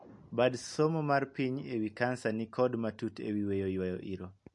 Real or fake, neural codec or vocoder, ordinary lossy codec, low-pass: real; none; MP3, 48 kbps; 10.8 kHz